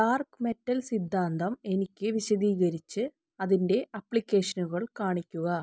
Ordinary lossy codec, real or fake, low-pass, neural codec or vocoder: none; real; none; none